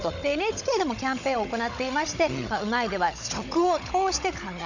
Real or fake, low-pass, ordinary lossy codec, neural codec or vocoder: fake; 7.2 kHz; none; codec, 16 kHz, 16 kbps, FunCodec, trained on Chinese and English, 50 frames a second